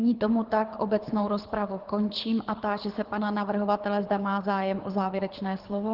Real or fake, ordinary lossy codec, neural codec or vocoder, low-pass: fake; Opus, 16 kbps; codec, 24 kHz, 6 kbps, HILCodec; 5.4 kHz